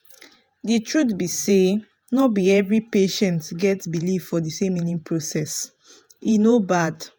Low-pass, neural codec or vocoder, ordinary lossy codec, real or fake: none; vocoder, 48 kHz, 128 mel bands, Vocos; none; fake